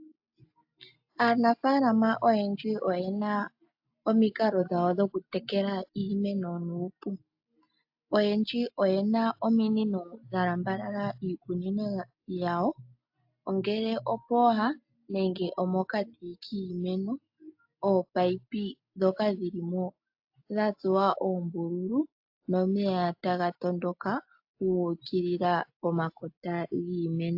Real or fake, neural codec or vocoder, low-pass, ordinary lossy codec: real; none; 5.4 kHz; AAC, 48 kbps